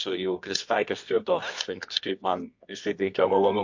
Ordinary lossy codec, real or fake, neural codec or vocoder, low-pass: MP3, 48 kbps; fake; codec, 24 kHz, 0.9 kbps, WavTokenizer, medium music audio release; 7.2 kHz